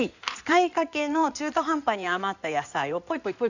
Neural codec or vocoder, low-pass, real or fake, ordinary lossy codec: codec, 16 kHz in and 24 kHz out, 2.2 kbps, FireRedTTS-2 codec; 7.2 kHz; fake; none